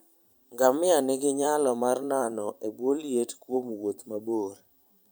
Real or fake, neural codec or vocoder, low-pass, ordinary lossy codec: fake; vocoder, 44.1 kHz, 128 mel bands every 512 samples, BigVGAN v2; none; none